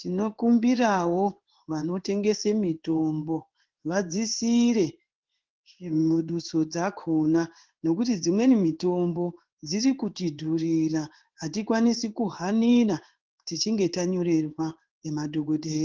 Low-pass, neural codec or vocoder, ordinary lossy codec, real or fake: 7.2 kHz; codec, 16 kHz in and 24 kHz out, 1 kbps, XY-Tokenizer; Opus, 16 kbps; fake